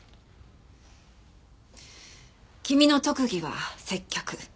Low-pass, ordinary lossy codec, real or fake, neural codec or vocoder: none; none; real; none